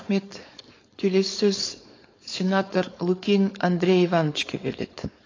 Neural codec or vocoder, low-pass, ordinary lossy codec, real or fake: codec, 16 kHz, 4.8 kbps, FACodec; 7.2 kHz; AAC, 32 kbps; fake